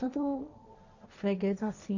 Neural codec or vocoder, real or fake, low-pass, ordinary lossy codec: codec, 16 kHz, 1.1 kbps, Voila-Tokenizer; fake; 7.2 kHz; none